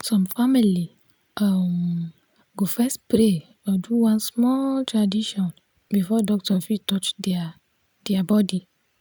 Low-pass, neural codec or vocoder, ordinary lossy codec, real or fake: none; none; none; real